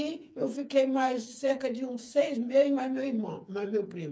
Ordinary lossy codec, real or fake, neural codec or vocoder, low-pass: none; fake; codec, 16 kHz, 4 kbps, FreqCodec, smaller model; none